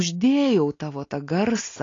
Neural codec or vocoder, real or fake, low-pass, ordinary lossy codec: none; real; 7.2 kHz; AAC, 32 kbps